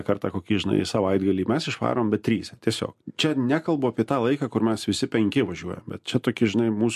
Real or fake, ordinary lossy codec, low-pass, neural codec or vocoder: real; MP3, 64 kbps; 14.4 kHz; none